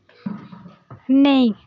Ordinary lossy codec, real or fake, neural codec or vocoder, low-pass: none; real; none; 7.2 kHz